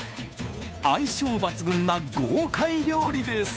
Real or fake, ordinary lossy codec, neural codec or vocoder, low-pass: fake; none; codec, 16 kHz, 2 kbps, FunCodec, trained on Chinese and English, 25 frames a second; none